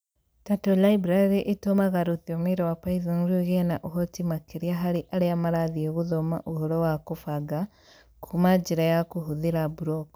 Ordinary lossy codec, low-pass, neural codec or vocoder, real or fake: none; none; none; real